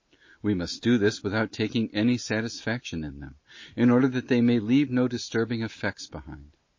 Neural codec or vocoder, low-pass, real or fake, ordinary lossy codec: none; 7.2 kHz; real; MP3, 32 kbps